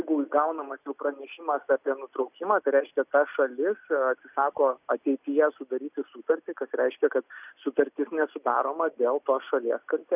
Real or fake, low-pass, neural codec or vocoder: real; 3.6 kHz; none